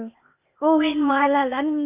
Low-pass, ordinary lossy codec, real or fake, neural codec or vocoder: 3.6 kHz; Opus, 64 kbps; fake; codec, 16 kHz, 0.8 kbps, ZipCodec